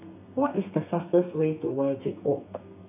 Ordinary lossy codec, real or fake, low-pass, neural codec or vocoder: none; fake; 3.6 kHz; codec, 32 kHz, 1.9 kbps, SNAC